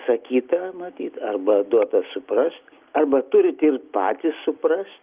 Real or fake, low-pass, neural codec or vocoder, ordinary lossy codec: real; 3.6 kHz; none; Opus, 32 kbps